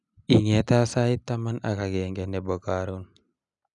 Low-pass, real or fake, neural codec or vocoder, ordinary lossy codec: 10.8 kHz; real; none; none